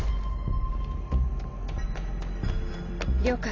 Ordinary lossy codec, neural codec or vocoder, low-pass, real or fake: AAC, 32 kbps; none; 7.2 kHz; real